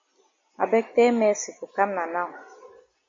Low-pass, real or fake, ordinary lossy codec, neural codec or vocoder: 7.2 kHz; real; MP3, 32 kbps; none